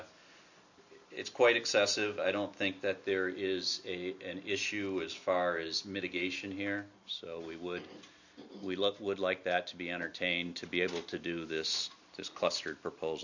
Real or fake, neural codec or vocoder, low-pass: real; none; 7.2 kHz